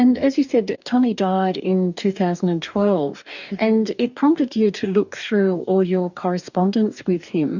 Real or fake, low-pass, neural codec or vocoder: fake; 7.2 kHz; codec, 44.1 kHz, 2.6 kbps, DAC